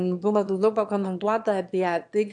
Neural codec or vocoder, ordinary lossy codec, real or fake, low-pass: autoencoder, 22.05 kHz, a latent of 192 numbers a frame, VITS, trained on one speaker; AAC, 64 kbps; fake; 9.9 kHz